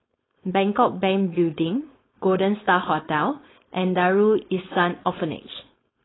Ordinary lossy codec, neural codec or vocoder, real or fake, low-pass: AAC, 16 kbps; codec, 16 kHz, 4.8 kbps, FACodec; fake; 7.2 kHz